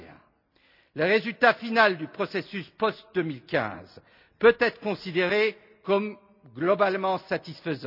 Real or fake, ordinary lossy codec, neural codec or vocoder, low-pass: real; none; none; 5.4 kHz